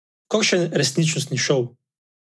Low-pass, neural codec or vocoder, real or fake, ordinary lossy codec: none; none; real; none